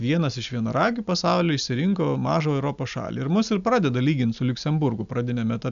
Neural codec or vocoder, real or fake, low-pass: none; real; 7.2 kHz